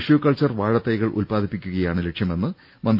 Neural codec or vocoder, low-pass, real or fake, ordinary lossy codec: none; 5.4 kHz; real; none